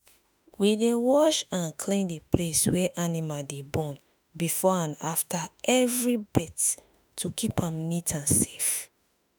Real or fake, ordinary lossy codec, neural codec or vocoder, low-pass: fake; none; autoencoder, 48 kHz, 32 numbers a frame, DAC-VAE, trained on Japanese speech; none